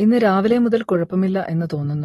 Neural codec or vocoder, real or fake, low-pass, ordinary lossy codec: none; real; 19.8 kHz; AAC, 32 kbps